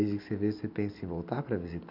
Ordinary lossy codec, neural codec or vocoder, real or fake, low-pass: none; none; real; 5.4 kHz